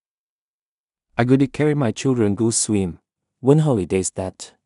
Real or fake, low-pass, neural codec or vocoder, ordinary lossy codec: fake; 10.8 kHz; codec, 16 kHz in and 24 kHz out, 0.4 kbps, LongCat-Audio-Codec, two codebook decoder; none